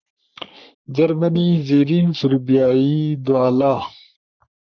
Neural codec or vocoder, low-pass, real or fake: codec, 44.1 kHz, 3.4 kbps, Pupu-Codec; 7.2 kHz; fake